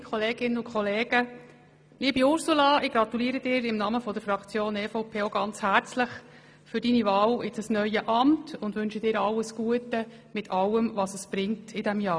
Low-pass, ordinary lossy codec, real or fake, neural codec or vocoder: none; none; real; none